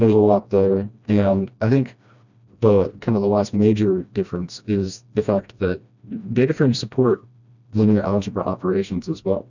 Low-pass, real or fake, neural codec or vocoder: 7.2 kHz; fake; codec, 16 kHz, 1 kbps, FreqCodec, smaller model